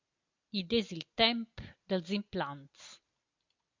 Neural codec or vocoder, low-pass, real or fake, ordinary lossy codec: none; 7.2 kHz; real; MP3, 64 kbps